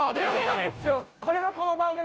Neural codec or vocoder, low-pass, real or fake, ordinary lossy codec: codec, 16 kHz, 0.5 kbps, FunCodec, trained on Chinese and English, 25 frames a second; none; fake; none